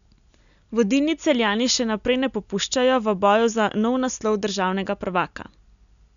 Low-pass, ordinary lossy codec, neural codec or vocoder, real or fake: 7.2 kHz; none; none; real